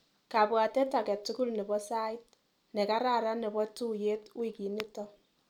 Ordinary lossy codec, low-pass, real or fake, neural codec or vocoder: none; 19.8 kHz; fake; vocoder, 44.1 kHz, 128 mel bands every 256 samples, BigVGAN v2